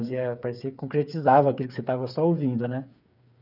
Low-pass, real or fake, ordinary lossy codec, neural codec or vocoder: 5.4 kHz; fake; none; codec, 16 kHz, 8 kbps, FreqCodec, smaller model